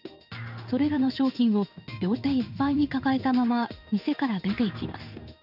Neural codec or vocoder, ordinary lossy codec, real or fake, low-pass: codec, 16 kHz in and 24 kHz out, 1 kbps, XY-Tokenizer; none; fake; 5.4 kHz